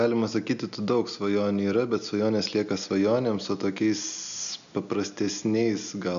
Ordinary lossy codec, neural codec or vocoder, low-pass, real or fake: AAC, 96 kbps; none; 7.2 kHz; real